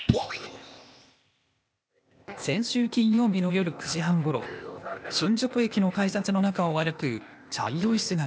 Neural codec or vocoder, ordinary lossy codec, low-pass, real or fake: codec, 16 kHz, 0.8 kbps, ZipCodec; none; none; fake